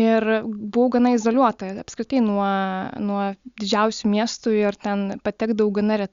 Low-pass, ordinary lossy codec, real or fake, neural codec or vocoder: 7.2 kHz; Opus, 64 kbps; real; none